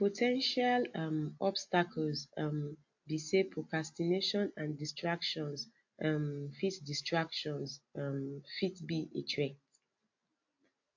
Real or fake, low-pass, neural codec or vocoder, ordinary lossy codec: real; 7.2 kHz; none; AAC, 48 kbps